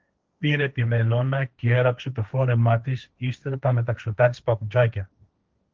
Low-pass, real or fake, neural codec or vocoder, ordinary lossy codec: 7.2 kHz; fake; codec, 16 kHz, 1.1 kbps, Voila-Tokenizer; Opus, 32 kbps